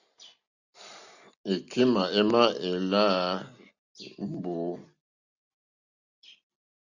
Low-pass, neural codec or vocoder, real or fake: 7.2 kHz; none; real